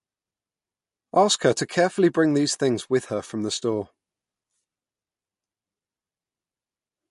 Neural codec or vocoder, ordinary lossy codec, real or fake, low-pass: none; MP3, 48 kbps; real; 14.4 kHz